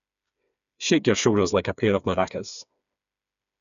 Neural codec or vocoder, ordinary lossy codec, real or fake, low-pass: codec, 16 kHz, 4 kbps, FreqCodec, smaller model; none; fake; 7.2 kHz